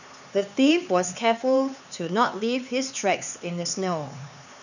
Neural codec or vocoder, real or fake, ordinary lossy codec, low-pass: codec, 16 kHz, 4 kbps, X-Codec, HuBERT features, trained on LibriSpeech; fake; none; 7.2 kHz